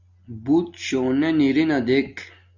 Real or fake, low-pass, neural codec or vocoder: real; 7.2 kHz; none